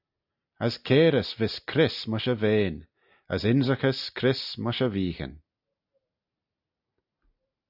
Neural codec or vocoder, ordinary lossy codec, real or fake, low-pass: none; AAC, 48 kbps; real; 5.4 kHz